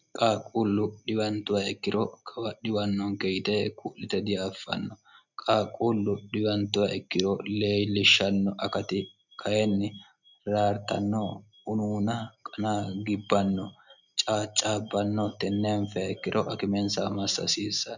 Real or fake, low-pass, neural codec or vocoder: real; 7.2 kHz; none